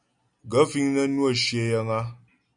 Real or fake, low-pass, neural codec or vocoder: real; 9.9 kHz; none